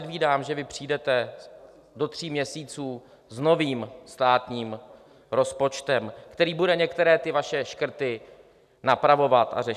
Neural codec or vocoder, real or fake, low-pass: none; real; 14.4 kHz